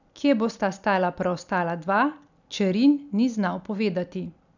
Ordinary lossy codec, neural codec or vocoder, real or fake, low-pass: none; none; real; 7.2 kHz